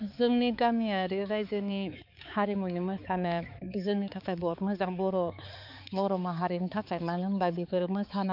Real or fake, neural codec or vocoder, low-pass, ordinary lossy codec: fake; codec, 16 kHz, 4 kbps, X-Codec, HuBERT features, trained on balanced general audio; 5.4 kHz; none